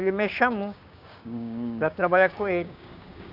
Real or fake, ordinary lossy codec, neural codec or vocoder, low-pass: fake; none; codec, 16 kHz, 6 kbps, DAC; 5.4 kHz